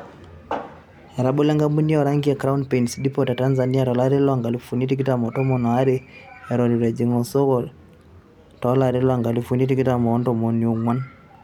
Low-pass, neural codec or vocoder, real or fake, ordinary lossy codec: 19.8 kHz; none; real; none